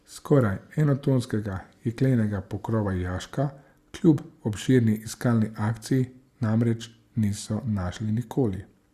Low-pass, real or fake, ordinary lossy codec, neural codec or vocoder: 14.4 kHz; real; Opus, 64 kbps; none